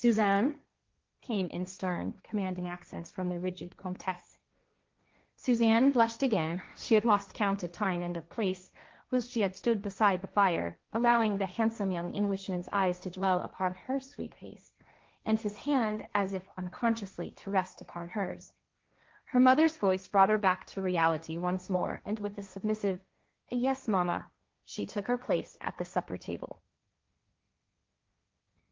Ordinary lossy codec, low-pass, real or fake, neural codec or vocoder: Opus, 24 kbps; 7.2 kHz; fake; codec, 16 kHz, 1.1 kbps, Voila-Tokenizer